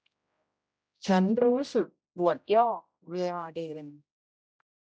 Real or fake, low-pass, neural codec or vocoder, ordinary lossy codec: fake; none; codec, 16 kHz, 0.5 kbps, X-Codec, HuBERT features, trained on general audio; none